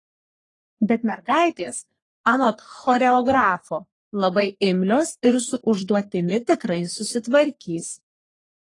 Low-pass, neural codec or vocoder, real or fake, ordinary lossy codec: 10.8 kHz; codec, 44.1 kHz, 3.4 kbps, Pupu-Codec; fake; AAC, 32 kbps